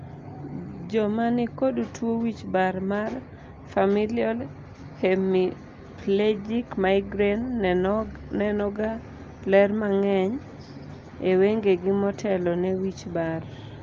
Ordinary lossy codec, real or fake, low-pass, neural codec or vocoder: Opus, 32 kbps; real; 7.2 kHz; none